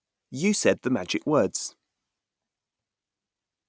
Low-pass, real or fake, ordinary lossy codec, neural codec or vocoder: none; real; none; none